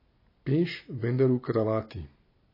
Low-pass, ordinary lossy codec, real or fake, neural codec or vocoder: 5.4 kHz; MP3, 24 kbps; fake; vocoder, 44.1 kHz, 128 mel bands every 256 samples, BigVGAN v2